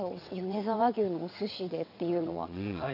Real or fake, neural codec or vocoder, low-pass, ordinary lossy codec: fake; vocoder, 22.05 kHz, 80 mel bands, WaveNeXt; 5.4 kHz; MP3, 48 kbps